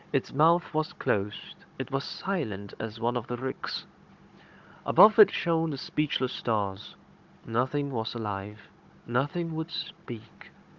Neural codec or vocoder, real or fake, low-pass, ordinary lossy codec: codec, 16 kHz, 16 kbps, FunCodec, trained on Chinese and English, 50 frames a second; fake; 7.2 kHz; Opus, 32 kbps